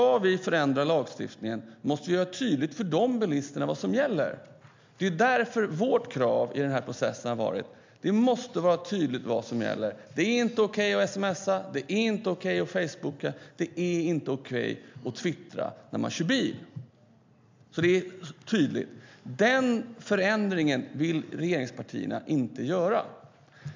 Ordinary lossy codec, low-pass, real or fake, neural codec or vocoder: MP3, 64 kbps; 7.2 kHz; real; none